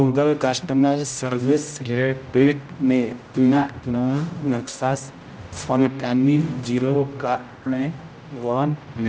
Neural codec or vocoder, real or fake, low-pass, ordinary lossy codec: codec, 16 kHz, 0.5 kbps, X-Codec, HuBERT features, trained on general audio; fake; none; none